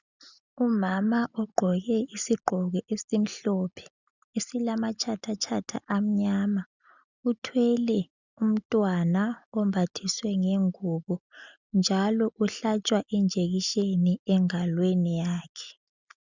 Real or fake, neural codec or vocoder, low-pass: real; none; 7.2 kHz